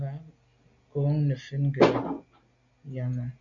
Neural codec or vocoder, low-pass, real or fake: none; 7.2 kHz; real